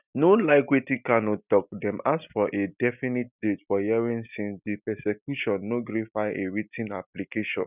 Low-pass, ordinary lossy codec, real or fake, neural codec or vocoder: 3.6 kHz; none; real; none